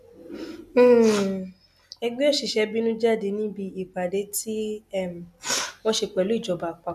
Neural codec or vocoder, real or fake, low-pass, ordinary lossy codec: none; real; 14.4 kHz; none